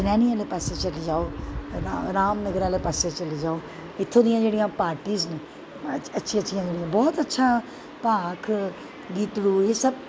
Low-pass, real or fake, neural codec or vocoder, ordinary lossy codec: none; real; none; none